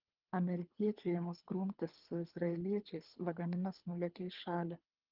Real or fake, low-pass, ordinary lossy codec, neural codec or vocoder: fake; 5.4 kHz; Opus, 32 kbps; codec, 24 kHz, 3 kbps, HILCodec